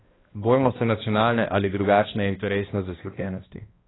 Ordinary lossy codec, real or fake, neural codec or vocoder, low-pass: AAC, 16 kbps; fake; codec, 16 kHz, 1 kbps, X-Codec, HuBERT features, trained on balanced general audio; 7.2 kHz